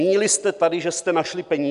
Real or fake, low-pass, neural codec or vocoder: real; 10.8 kHz; none